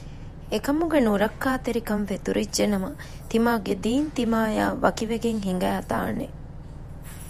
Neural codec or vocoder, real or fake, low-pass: vocoder, 48 kHz, 128 mel bands, Vocos; fake; 14.4 kHz